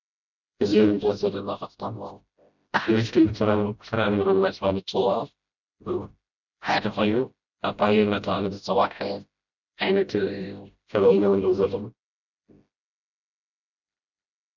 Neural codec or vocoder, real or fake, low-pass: codec, 16 kHz, 0.5 kbps, FreqCodec, smaller model; fake; 7.2 kHz